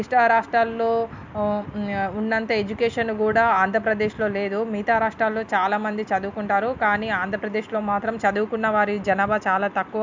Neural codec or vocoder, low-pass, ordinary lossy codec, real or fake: none; 7.2 kHz; MP3, 64 kbps; real